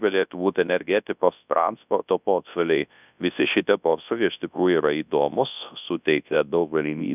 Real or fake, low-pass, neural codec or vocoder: fake; 3.6 kHz; codec, 24 kHz, 0.9 kbps, WavTokenizer, large speech release